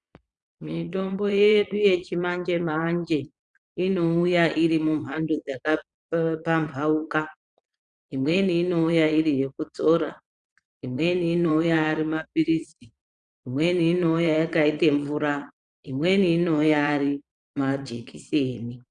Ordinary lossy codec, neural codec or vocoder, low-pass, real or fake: Opus, 64 kbps; vocoder, 22.05 kHz, 80 mel bands, Vocos; 9.9 kHz; fake